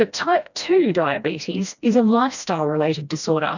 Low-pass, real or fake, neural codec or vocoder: 7.2 kHz; fake; codec, 16 kHz, 1 kbps, FreqCodec, smaller model